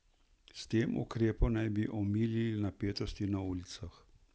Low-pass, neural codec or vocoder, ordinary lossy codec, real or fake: none; none; none; real